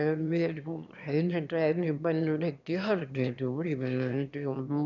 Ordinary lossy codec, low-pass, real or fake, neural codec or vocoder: none; 7.2 kHz; fake; autoencoder, 22.05 kHz, a latent of 192 numbers a frame, VITS, trained on one speaker